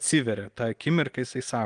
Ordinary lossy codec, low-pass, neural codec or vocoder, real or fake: Opus, 24 kbps; 9.9 kHz; none; real